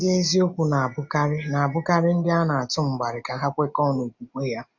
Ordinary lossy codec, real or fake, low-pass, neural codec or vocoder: none; real; 7.2 kHz; none